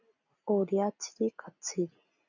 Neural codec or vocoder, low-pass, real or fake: none; 7.2 kHz; real